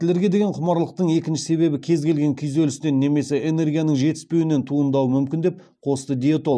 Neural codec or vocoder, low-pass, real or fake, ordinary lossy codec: none; none; real; none